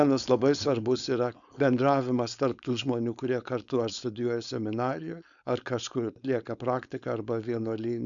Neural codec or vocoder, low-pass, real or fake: codec, 16 kHz, 4.8 kbps, FACodec; 7.2 kHz; fake